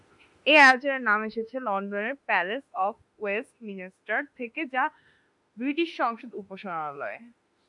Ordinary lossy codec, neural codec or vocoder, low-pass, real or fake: MP3, 64 kbps; autoencoder, 48 kHz, 32 numbers a frame, DAC-VAE, trained on Japanese speech; 10.8 kHz; fake